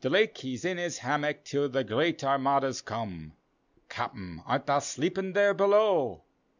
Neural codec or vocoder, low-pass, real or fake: none; 7.2 kHz; real